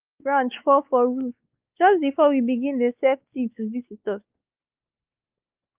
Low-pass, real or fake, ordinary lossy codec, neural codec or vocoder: 3.6 kHz; fake; Opus, 24 kbps; codec, 16 kHz, 4 kbps, X-Codec, WavLM features, trained on Multilingual LibriSpeech